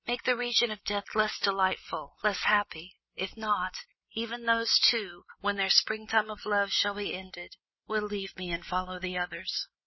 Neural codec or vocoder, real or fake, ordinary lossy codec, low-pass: none; real; MP3, 24 kbps; 7.2 kHz